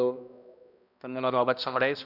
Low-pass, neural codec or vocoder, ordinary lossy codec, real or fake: 5.4 kHz; codec, 16 kHz, 1 kbps, X-Codec, HuBERT features, trained on balanced general audio; none; fake